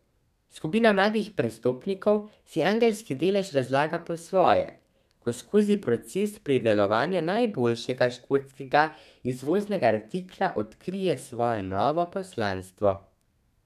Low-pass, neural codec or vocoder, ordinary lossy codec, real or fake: 14.4 kHz; codec, 32 kHz, 1.9 kbps, SNAC; none; fake